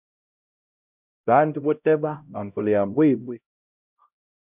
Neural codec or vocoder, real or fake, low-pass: codec, 16 kHz, 0.5 kbps, X-Codec, HuBERT features, trained on LibriSpeech; fake; 3.6 kHz